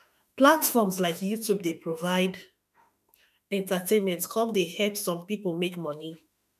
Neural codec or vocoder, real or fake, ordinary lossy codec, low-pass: autoencoder, 48 kHz, 32 numbers a frame, DAC-VAE, trained on Japanese speech; fake; none; 14.4 kHz